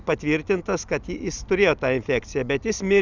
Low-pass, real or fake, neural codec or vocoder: 7.2 kHz; real; none